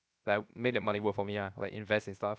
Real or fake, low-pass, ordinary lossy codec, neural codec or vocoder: fake; none; none; codec, 16 kHz, 0.7 kbps, FocalCodec